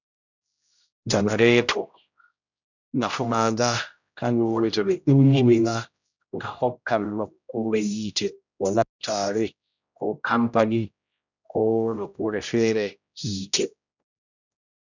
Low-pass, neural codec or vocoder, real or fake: 7.2 kHz; codec, 16 kHz, 0.5 kbps, X-Codec, HuBERT features, trained on general audio; fake